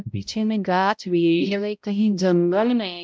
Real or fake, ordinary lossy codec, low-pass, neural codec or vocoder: fake; none; none; codec, 16 kHz, 0.5 kbps, X-Codec, HuBERT features, trained on balanced general audio